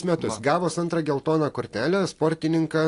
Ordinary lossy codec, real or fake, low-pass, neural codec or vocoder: AAC, 48 kbps; real; 10.8 kHz; none